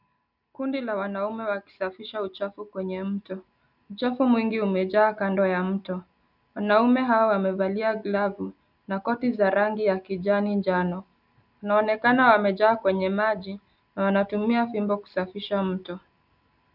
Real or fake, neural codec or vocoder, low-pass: real; none; 5.4 kHz